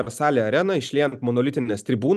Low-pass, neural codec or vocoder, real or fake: 14.4 kHz; none; real